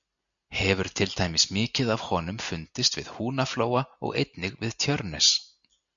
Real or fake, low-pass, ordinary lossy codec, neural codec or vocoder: real; 7.2 kHz; MP3, 96 kbps; none